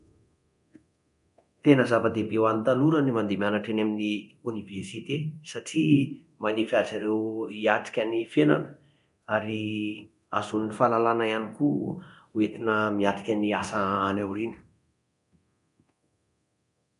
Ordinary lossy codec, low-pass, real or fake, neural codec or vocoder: AAC, 96 kbps; 10.8 kHz; fake; codec, 24 kHz, 0.9 kbps, DualCodec